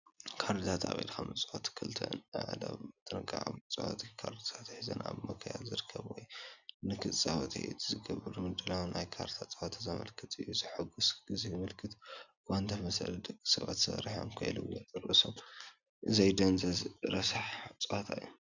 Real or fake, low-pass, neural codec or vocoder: real; 7.2 kHz; none